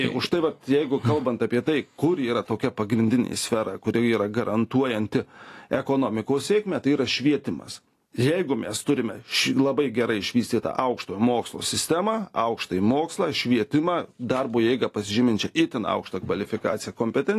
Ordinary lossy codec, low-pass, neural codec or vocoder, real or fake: AAC, 48 kbps; 14.4 kHz; none; real